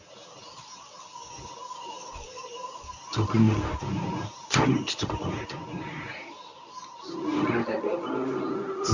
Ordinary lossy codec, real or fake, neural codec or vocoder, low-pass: Opus, 64 kbps; fake; codec, 24 kHz, 0.9 kbps, WavTokenizer, medium speech release version 1; 7.2 kHz